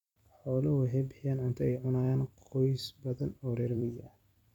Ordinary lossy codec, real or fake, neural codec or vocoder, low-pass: none; fake; vocoder, 44.1 kHz, 128 mel bands every 256 samples, BigVGAN v2; 19.8 kHz